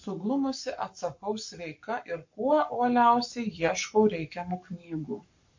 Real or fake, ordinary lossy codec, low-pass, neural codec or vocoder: fake; MP3, 48 kbps; 7.2 kHz; codec, 44.1 kHz, 7.8 kbps, Pupu-Codec